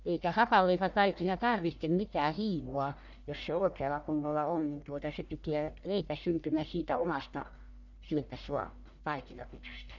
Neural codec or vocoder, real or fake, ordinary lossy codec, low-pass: codec, 44.1 kHz, 1.7 kbps, Pupu-Codec; fake; none; 7.2 kHz